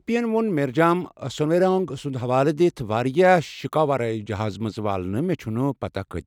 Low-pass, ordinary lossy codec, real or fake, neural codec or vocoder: 19.8 kHz; none; real; none